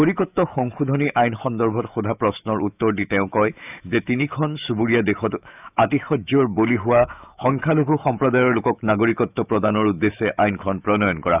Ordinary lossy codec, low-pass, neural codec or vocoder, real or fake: none; 3.6 kHz; autoencoder, 48 kHz, 128 numbers a frame, DAC-VAE, trained on Japanese speech; fake